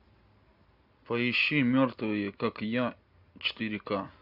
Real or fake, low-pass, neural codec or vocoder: real; 5.4 kHz; none